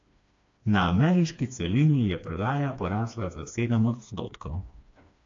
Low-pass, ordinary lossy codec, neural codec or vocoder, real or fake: 7.2 kHz; AAC, 48 kbps; codec, 16 kHz, 2 kbps, FreqCodec, smaller model; fake